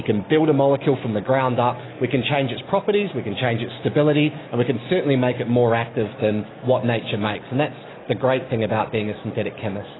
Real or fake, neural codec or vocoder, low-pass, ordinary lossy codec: real; none; 7.2 kHz; AAC, 16 kbps